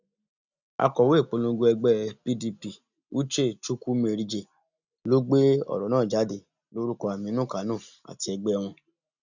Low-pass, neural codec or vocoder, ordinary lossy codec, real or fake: 7.2 kHz; none; none; real